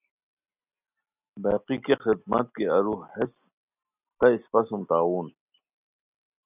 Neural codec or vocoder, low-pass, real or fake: none; 3.6 kHz; real